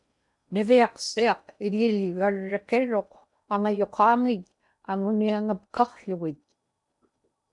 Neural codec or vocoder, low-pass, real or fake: codec, 16 kHz in and 24 kHz out, 0.8 kbps, FocalCodec, streaming, 65536 codes; 10.8 kHz; fake